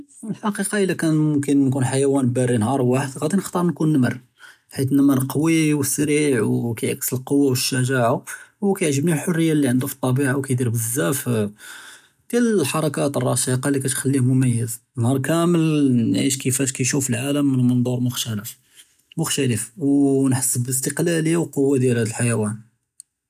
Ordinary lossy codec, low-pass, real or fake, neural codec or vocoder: none; 14.4 kHz; real; none